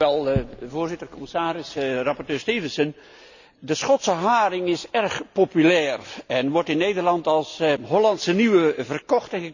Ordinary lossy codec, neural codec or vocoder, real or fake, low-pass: none; none; real; 7.2 kHz